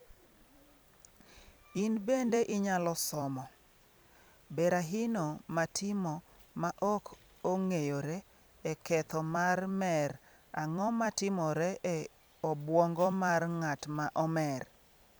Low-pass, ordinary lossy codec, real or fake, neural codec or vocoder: none; none; fake; vocoder, 44.1 kHz, 128 mel bands every 256 samples, BigVGAN v2